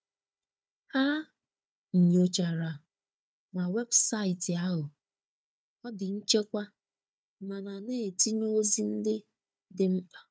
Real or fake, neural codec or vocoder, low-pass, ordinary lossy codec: fake; codec, 16 kHz, 4 kbps, FunCodec, trained on Chinese and English, 50 frames a second; none; none